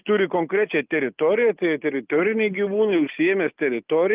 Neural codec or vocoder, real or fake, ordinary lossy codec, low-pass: none; real; Opus, 32 kbps; 3.6 kHz